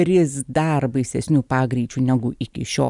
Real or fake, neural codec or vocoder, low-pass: real; none; 10.8 kHz